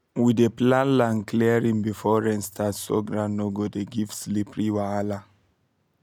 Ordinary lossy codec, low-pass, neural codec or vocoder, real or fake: none; 19.8 kHz; none; real